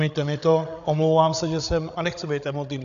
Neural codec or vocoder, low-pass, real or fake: codec, 16 kHz, 8 kbps, FreqCodec, larger model; 7.2 kHz; fake